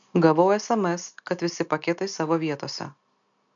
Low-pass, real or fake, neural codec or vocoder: 7.2 kHz; real; none